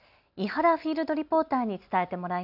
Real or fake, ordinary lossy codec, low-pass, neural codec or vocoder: real; none; 5.4 kHz; none